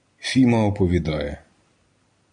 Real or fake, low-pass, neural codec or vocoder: real; 9.9 kHz; none